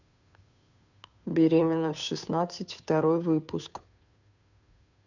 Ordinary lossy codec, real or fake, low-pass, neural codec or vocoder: none; fake; 7.2 kHz; codec, 16 kHz, 2 kbps, FunCodec, trained on Chinese and English, 25 frames a second